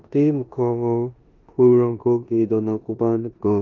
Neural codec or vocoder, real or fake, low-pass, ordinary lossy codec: codec, 16 kHz in and 24 kHz out, 0.9 kbps, LongCat-Audio-Codec, four codebook decoder; fake; 7.2 kHz; Opus, 32 kbps